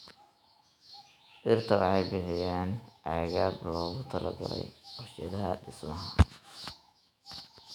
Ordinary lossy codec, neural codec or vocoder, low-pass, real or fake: none; autoencoder, 48 kHz, 128 numbers a frame, DAC-VAE, trained on Japanese speech; 19.8 kHz; fake